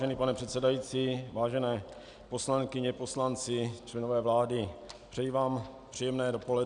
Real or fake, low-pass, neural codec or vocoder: real; 9.9 kHz; none